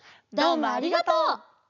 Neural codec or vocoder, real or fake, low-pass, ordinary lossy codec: none; real; 7.2 kHz; none